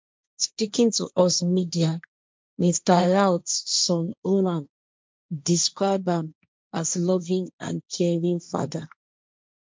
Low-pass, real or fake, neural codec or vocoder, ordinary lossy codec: none; fake; codec, 16 kHz, 1.1 kbps, Voila-Tokenizer; none